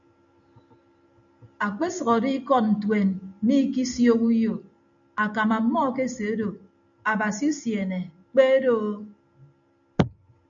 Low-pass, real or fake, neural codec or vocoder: 7.2 kHz; real; none